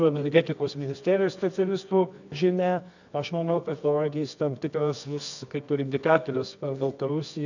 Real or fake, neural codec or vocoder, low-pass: fake; codec, 24 kHz, 0.9 kbps, WavTokenizer, medium music audio release; 7.2 kHz